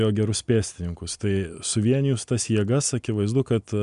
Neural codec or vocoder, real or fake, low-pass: none; real; 10.8 kHz